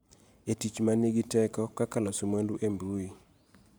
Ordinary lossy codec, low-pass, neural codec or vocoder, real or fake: none; none; vocoder, 44.1 kHz, 128 mel bands every 512 samples, BigVGAN v2; fake